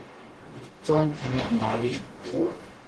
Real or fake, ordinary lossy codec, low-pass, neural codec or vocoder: fake; Opus, 16 kbps; 10.8 kHz; codec, 44.1 kHz, 0.9 kbps, DAC